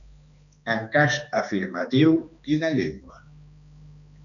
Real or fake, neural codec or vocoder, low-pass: fake; codec, 16 kHz, 2 kbps, X-Codec, HuBERT features, trained on balanced general audio; 7.2 kHz